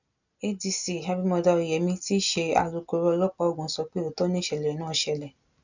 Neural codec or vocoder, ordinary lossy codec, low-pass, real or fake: none; none; 7.2 kHz; real